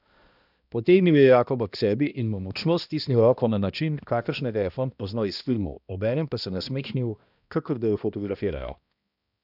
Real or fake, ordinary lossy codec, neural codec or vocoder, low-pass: fake; none; codec, 16 kHz, 1 kbps, X-Codec, HuBERT features, trained on balanced general audio; 5.4 kHz